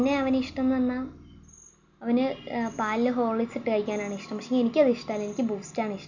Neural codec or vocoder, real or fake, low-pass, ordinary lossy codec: none; real; 7.2 kHz; none